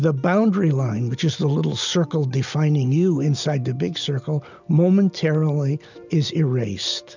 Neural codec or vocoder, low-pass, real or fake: none; 7.2 kHz; real